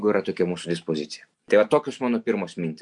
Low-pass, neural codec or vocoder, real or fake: 10.8 kHz; none; real